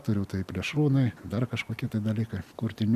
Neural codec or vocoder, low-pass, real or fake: none; 14.4 kHz; real